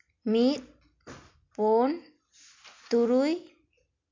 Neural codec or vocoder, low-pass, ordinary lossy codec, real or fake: none; 7.2 kHz; MP3, 48 kbps; real